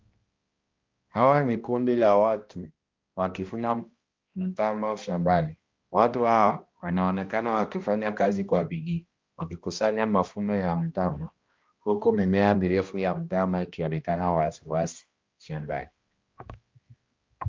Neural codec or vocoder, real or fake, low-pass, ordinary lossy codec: codec, 16 kHz, 1 kbps, X-Codec, HuBERT features, trained on balanced general audio; fake; 7.2 kHz; Opus, 24 kbps